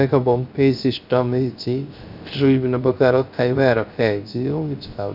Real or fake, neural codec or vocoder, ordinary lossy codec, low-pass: fake; codec, 16 kHz, 0.3 kbps, FocalCodec; none; 5.4 kHz